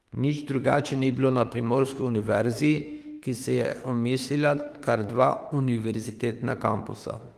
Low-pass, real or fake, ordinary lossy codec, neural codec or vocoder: 14.4 kHz; fake; Opus, 24 kbps; autoencoder, 48 kHz, 32 numbers a frame, DAC-VAE, trained on Japanese speech